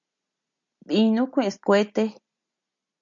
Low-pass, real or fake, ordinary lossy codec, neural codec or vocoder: 7.2 kHz; real; MP3, 64 kbps; none